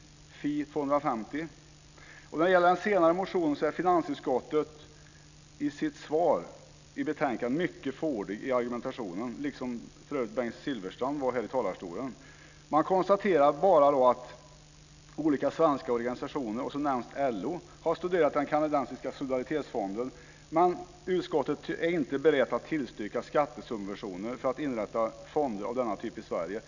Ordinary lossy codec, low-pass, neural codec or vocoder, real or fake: none; 7.2 kHz; none; real